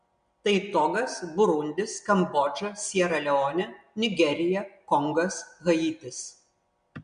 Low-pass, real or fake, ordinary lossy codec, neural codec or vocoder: 9.9 kHz; real; MP3, 64 kbps; none